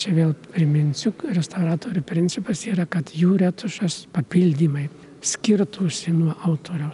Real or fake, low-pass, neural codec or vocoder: real; 10.8 kHz; none